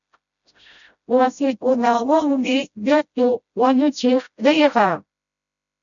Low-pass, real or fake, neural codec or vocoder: 7.2 kHz; fake; codec, 16 kHz, 0.5 kbps, FreqCodec, smaller model